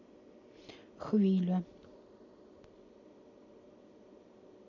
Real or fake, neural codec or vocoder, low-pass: real; none; 7.2 kHz